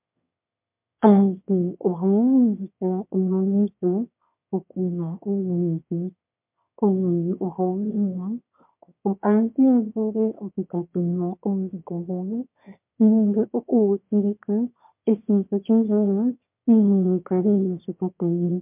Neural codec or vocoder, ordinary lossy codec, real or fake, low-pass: autoencoder, 22.05 kHz, a latent of 192 numbers a frame, VITS, trained on one speaker; MP3, 32 kbps; fake; 3.6 kHz